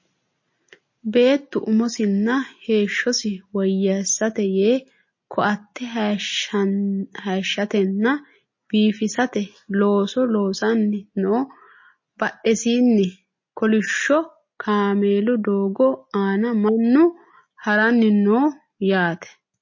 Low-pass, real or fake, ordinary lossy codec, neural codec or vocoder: 7.2 kHz; real; MP3, 32 kbps; none